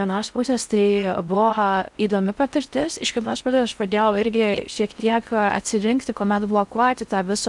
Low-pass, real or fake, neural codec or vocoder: 10.8 kHz; fake; codec, 16 kHz in and 24 kHz out, 0.6 kbps, FocalCodec, streaming, 4096 codes